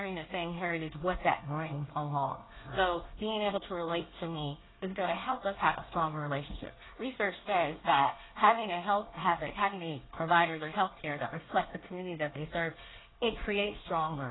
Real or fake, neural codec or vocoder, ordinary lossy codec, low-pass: fake; codec, 24 kHz, 1 kbps, SNAC; AAC, 16 kbps; 7.2 kHz